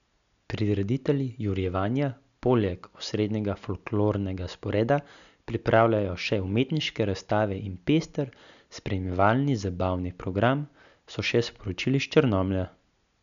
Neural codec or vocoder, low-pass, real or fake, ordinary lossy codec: none; 7.2 kHz; real; none